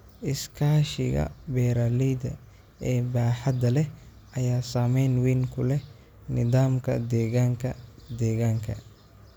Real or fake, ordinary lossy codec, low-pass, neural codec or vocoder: real; none; none; none